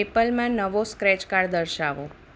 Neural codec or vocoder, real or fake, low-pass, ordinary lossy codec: none; real; none; none